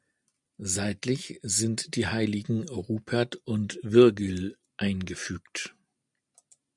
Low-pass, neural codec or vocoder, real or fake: 10.8 kHz; none; real